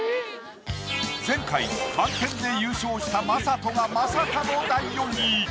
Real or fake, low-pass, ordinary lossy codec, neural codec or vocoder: real; none; none; none